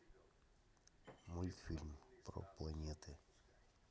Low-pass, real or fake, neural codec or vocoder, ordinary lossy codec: none; real; none; none